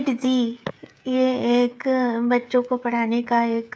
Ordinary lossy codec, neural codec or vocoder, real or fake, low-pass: none; codec, 16 kHz, 16 kbps, FreqCodec, smaller model; fake; none